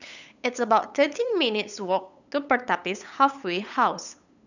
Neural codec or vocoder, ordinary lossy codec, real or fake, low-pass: codec, 16 kHz, 8 kbps, FunCodec, trained on LibriTTS, 25 frames a second; none; fake; 7.2 kHz